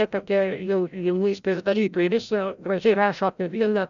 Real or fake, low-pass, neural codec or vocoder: fake; 7.2 kHz; codec, 16 kHz, 0.5 kbps, FreqCodec, larger model